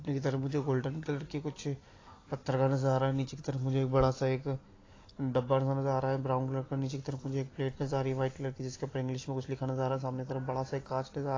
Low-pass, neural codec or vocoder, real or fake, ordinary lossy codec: 7.2 kHz; none; real; AAC, 32 kbps